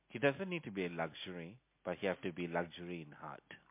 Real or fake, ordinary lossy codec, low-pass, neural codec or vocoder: real; MP3, 24 kbps; 3.6 kHz; none